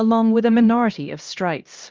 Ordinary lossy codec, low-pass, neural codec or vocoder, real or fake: Opus, 32 kbps; 7.2 kHz; codec, 16 kHz, 1 kbps, X-Codec, HuBERT features, trained on LibriSpeech; fake